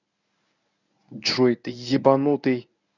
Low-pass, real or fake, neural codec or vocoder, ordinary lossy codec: 7.2 kHz; fake; codec, 24 kHz, 0.9 kbps, WavTokenizer, medium speech release version 1; none